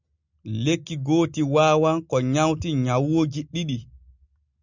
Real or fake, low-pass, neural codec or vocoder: real; 7.2 kHz; none